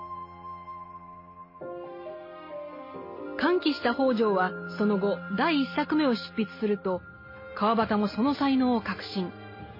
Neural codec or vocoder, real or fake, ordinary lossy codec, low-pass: none; real; MP3, 24 kbps; 5.4 kHz